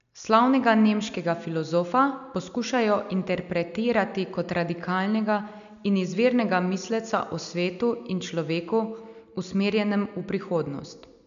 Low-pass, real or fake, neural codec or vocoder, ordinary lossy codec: 7.2 kHz; real; none; none